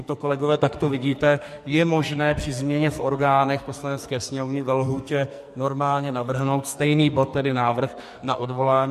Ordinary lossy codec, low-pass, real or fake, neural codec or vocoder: MP3, 64 kbps; 14.4 kHz; fake; codec, 44.1 kHz, 2.6 kbps, SNAC